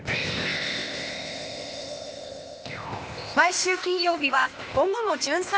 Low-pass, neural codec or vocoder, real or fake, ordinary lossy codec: none; codec, 16 kHz, 0.8 kbps, ZipCodec; fake; none